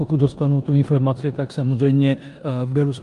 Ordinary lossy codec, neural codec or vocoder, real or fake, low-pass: Opus, 32 kbps; codec, 16 kHz in and 24 kHz out, 0.9 kbps, LongCat-Audio-Codec, four codebook decoder; fake; 10.8 kHz